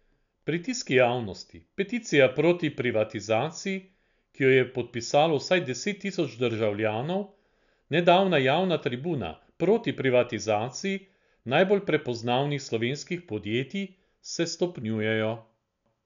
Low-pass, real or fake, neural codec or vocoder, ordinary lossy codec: 7.2 kHz; real; none; none